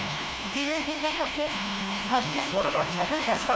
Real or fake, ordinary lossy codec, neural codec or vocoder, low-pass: fake; none; codec, 16 kHz, 1 kbps, FunCodec, trained on LibriTTS, 50 frames a second; none